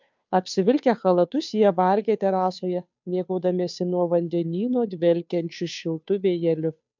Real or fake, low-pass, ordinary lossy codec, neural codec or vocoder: fake; 7.2 kHz; MP3, 64 kbps; codec, 16 kHz, 2 kbps, FunCodec, trained on Chinese and English, 25 frames a second